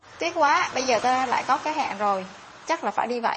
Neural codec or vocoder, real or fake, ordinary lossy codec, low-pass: vocoder, 22.05 kHz, 80 mel bands, WaveNeXt; fake; MP3, 32 kbps; 9.9 kHz